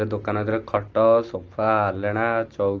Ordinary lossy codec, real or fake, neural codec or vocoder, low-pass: Opus, 16 kbps; real; none; 7.2 kHz